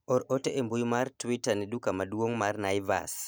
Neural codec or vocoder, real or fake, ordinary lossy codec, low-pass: none; real; none; none